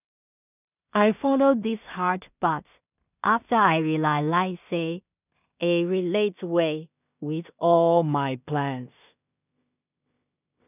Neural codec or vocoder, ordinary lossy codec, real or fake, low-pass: codec, 16 kHz in and 24 kHz out, 0.4 kbps, LongCat-Audio-Codec, two codebook decoder; none; fake; 3.6 kHz